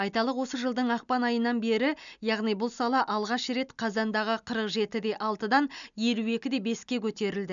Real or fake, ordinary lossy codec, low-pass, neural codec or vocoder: real; MP3, 96 kbps; 7.2 kHz; none